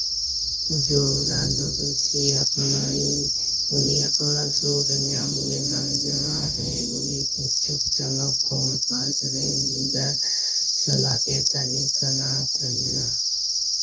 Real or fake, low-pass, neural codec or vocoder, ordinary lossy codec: fake; none; codec, 16 kHz, 0.9 kbps, LongCat-Audio-Codec; none